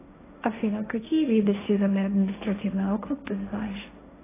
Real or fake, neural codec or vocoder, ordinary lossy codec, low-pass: fake; codec, 16 kHz, 1.1 kbps, Voila-Tokenizer; AAC, 16 kbps; 3.6 kHz